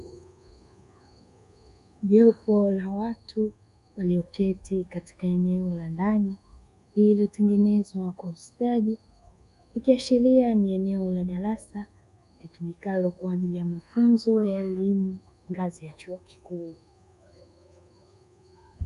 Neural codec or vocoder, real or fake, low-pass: codec, 24 kHz, 1.2 kbps, DualCodec; fake; 10.8 kHz